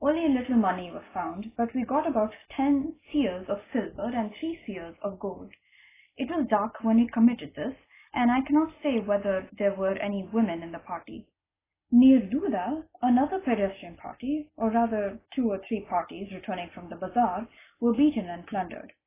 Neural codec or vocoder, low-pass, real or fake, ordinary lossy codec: none; 3.6 kHz; real; AAC, 16 kbps